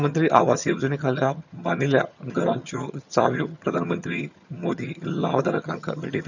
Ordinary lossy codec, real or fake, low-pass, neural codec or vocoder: none; fake; 7.2 kHz; vocoder, 22.05 kHz, 80 mel bands, HiFi-GAN